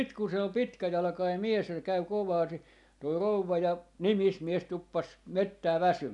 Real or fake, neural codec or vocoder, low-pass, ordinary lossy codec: real; none; 10.8 kHz; none